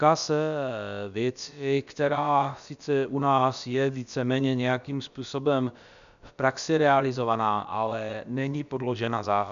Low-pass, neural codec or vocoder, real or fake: 7.2 kHz; codec, 16 kHz, about 1 kbps, DyCAST, with the encoder's durations; fake